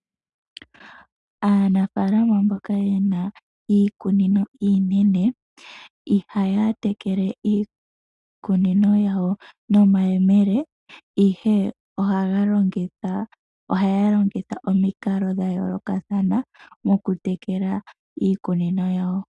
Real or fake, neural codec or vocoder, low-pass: real; none; 10.8 kHz